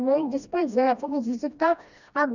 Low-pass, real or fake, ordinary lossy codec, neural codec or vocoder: 7.2 kHz; fake; none; codec, 16 kHz, 1 kbps, FreqCodec, smaller model